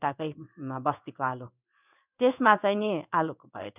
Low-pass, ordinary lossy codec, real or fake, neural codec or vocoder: 3.6 kHz; none; fake; codec, 16 kHz in and 24 kHz out, 1 kbps, XY-Tokenizer